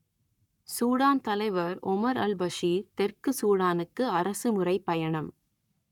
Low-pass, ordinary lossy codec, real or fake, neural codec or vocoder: 19.8 kHz; none; fake; codec, 44.1 kHz, 7.8 kbps, Pupu-Codec